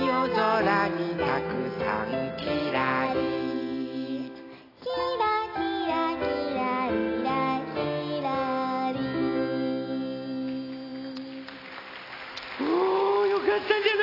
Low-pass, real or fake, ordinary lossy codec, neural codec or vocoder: 5.4 kHz; real; AAC, 24 kbps; none